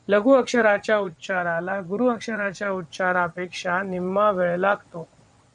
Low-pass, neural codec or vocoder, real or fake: 9.9 kHz; vocoder, 22.05 kHz, 80 mel bands, WaveNeXt; fake